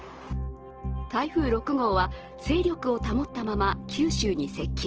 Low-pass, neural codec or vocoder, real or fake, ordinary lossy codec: 7.2 kHz; none; real; Opus, 16 kbps